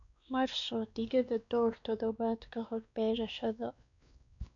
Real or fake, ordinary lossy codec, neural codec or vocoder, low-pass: fake; none; codec, 16 kHz, 2 kbps, X-Codec, WavLM features, trained on Multilingual LibriSpeech; 7.2 kHz